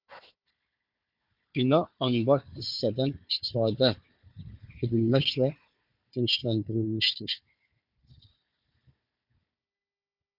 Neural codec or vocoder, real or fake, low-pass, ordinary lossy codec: codec, 16 kHz, 4 kbps, FunCodec, trained on Chinese and English, 50 frames a second; fake; 5.4 kHz; MP3, 48 kbps